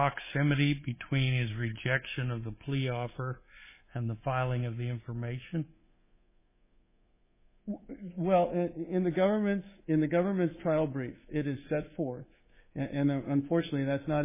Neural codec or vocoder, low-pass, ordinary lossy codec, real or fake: codec, 24 kHz, 3.1 kbps, DualCodec; 3.6 kHz; MP3, 16 kbps; fake